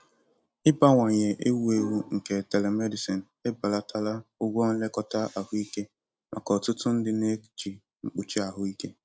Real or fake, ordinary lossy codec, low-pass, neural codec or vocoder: real; none; none; none